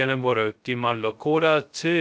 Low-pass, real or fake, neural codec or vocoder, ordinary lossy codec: none; fake; codec, 16 kHz, 0.2 kbps, FocalCodec; none